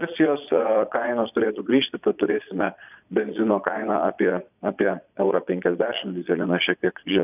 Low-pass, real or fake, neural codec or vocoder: 3.6 kHz; fake; vocoder, 22.05 kHz, 80 mel bands, Vocos